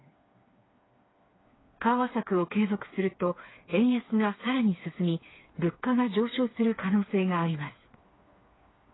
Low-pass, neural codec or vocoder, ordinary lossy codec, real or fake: 7.2 kHz; codec, 16 kHz, 4 kbps, FreqCodec, smaller model; AAC, 16 kbps; fake